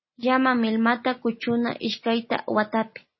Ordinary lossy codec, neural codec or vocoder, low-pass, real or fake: MP3, 24 kbps; none; 7.2 kHz; real